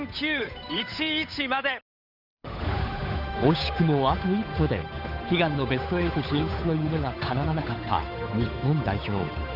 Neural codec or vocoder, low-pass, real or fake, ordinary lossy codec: codec, 16 kHz, 8 kbps, FunCodec, trained on Chinese and English, 25 frames a second; 5.4 kHz; fake; none